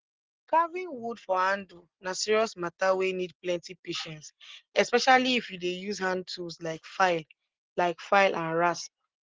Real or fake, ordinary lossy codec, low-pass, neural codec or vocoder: real; Opus, 16 kbps; 7.2 kHz; none